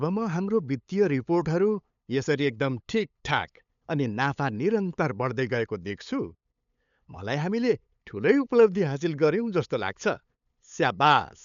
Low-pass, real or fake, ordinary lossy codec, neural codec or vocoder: 7.2 kHz; fake; none; codec, 16 kHz, 8 kbps, FunCodec, trained on LibriTTS, 25 frames a second